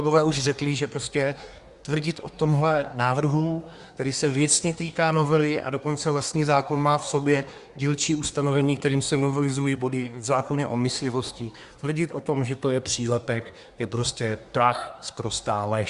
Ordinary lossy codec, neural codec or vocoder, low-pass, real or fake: Opus, 64 kbps; codec, 24 kHz, 1 kbps, SNAC; 10.8 kHz; fake